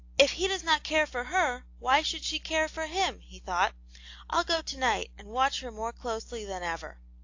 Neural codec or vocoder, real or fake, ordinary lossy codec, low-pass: none; real; AAC, 48 kbps; 7.2 kHz